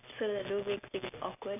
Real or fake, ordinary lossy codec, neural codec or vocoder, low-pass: real; none; none; 3.6 kHz